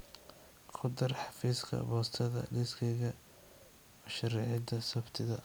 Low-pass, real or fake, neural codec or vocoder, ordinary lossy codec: none; real; none; none